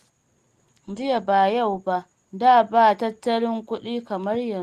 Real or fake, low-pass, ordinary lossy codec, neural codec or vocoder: real; 14.4 kHz; Opus, 24 kbps; none